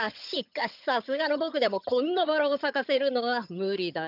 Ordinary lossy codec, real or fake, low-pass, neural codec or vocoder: none; fake; 5.4 kHz; vocoder, 22.05 kHz, 80 mel bands, HiFi-GAN